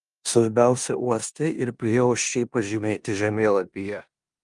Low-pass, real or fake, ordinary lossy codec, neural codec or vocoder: 10.8 kHz; fake; Opus, 32 kbps; codec, 16 kHz in and 24 kHz out, 0.9 kbps, LongCat-Audio-Codec, four codebook decoder